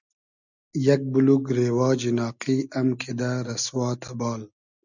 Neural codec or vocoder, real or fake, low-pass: none; real; 7.2 kHz